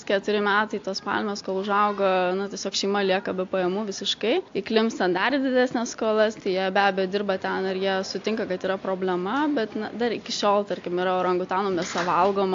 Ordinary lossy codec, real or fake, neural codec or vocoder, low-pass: AAC, 48 kbps; real; none; 7.2 kHz